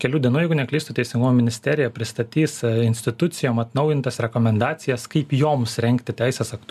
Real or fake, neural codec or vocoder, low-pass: real; none; 14.4 kHz